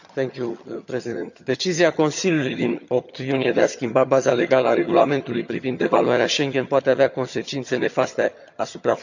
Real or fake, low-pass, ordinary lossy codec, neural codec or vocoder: fake; 7.2 kHz; none; vocoder, 22.05 kHz, 80 mel bands, HiFi-GAN